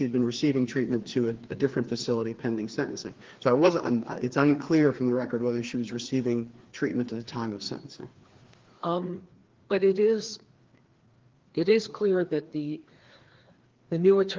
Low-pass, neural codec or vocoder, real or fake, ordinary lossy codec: 7.2 kHz; codec, 16 kHz, 2 kbps, FreqCodec, larger model; fake; Opus, 16 kbps